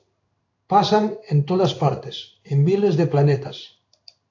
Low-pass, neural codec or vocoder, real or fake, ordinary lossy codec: 7.2 kHz; codec, 16 kHz in and 24 kHz out, 1 kbps, XY-Tokenizer; fake; AAC, 48 kbps